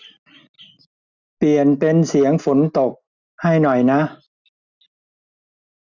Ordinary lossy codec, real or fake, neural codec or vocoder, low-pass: none; real; none; 7.2 kHz